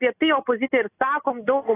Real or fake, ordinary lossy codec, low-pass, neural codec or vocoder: real; AAC, 16 kbps; 3.6 kHz; none